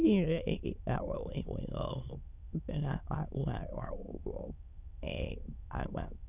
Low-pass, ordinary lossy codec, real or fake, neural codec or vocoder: 3.6 kHz; none; fake; autoencoder, 22.05 kHz, a latent of 192 numbers a frame, VITS, trained on many speakers